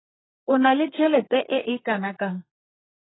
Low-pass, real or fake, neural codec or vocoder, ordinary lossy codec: 7.2 kHz; fake; codec, 32 kHz, 1.9 kbps, SNAC; AAC, 16 kbps